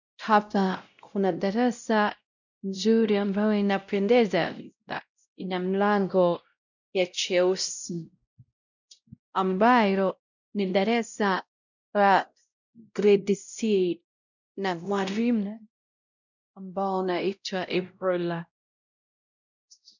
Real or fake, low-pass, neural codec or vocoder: fake; 7.2 kHz; codec, 16 kHz, 0.5 kbps, X-Codec, WavLM features, trained on Multilingual LibriSpeech